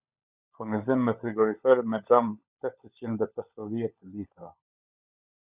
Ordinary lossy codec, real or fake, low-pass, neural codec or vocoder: Opus, 64 kbps; fake; 3.6 kHz; codec, 16 kHz, 16 kbps, FunCodec, trained on LibriTTS, 50 frames a second